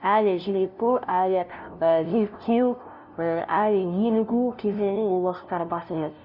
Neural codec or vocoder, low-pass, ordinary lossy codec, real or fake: codec, 16 kHz, 0.5 kbps, FunCodec, trained on LibriTTS, 25 frames a second; 5.4 kHz; none; fake